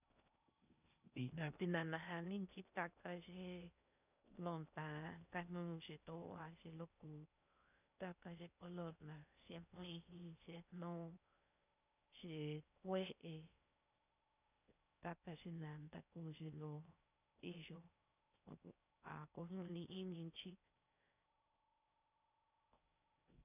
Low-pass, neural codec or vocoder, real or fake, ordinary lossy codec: 3.6 kHz; codec, 16 kHz in and 24 kHz out, 0.6 kbps, FocalCodec, streaming, 4096 codes; fake; none